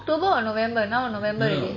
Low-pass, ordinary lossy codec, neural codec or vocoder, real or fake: 7.2 kHz; MP3, 32 kbps; none; real